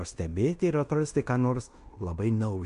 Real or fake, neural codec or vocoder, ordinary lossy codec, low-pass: fake; codec, 16 kHz in and 24 kHz out, 0.9 kbps, LongCat-Audio-Codec, fine tuned four codebook decoder; AAC, 64 kbps; 10.8 kHz